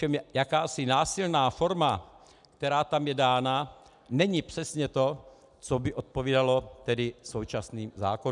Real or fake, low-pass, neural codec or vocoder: real; 10.8 kHz; none